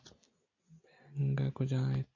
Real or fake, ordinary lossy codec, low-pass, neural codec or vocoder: real; AAC, 48 kbps; 7.2 kHz; none